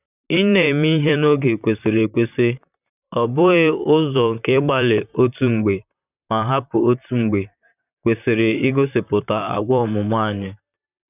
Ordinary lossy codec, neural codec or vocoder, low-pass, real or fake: none; vocoder, 44.1 kHz, 128 mel bands, Pupu-Vocoder; 3.6 kHz; fake